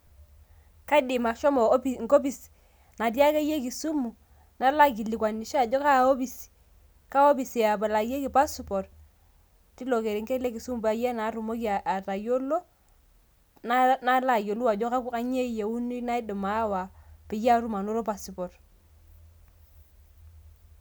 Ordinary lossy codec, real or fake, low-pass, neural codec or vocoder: none; real; none; none